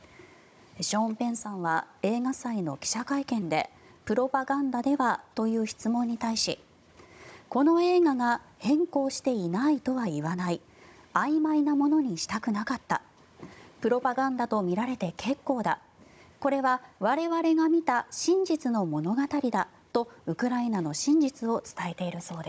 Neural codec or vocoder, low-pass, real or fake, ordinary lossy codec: codec, 16 kHz, 16 kbps, FunCodec, trained on Chinese and English, 50 frames a second; none; fake; none